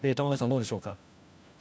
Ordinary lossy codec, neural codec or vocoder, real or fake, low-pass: none; codec, 16 kHz, 1 kbps, FunCodec, trained on LibriTTS, 50 frames a second; fake; none